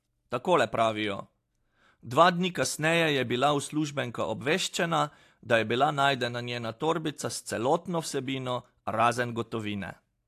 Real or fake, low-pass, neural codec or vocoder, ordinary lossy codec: real; 14.4 kHz; none; AAC, 64 kbps